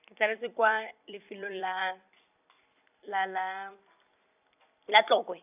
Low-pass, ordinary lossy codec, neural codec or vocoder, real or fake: 3.6 kHz; none; vocoder, 44.1 kHz, 128 mel bands every 256 samples, BigVGAN v2; fake